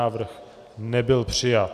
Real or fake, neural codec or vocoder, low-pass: real; none; 14.4 kHz